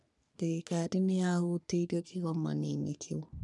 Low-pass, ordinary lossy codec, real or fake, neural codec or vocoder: 10.8 kHz; none; fake; codec, 44.1 kHz, 3.4 kbps, Pupu-Codec